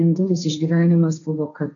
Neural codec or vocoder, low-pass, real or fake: codec, 16 kHz, 1.1 kbps, Voila-Tokenizer; 7.2 kHz; fake